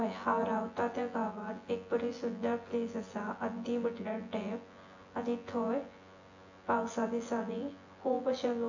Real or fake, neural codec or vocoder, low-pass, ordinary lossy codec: fake; vocoder, 24 kHz, 100 mel bands, Vocos; 7.2 kHz; AAC, 48 kbps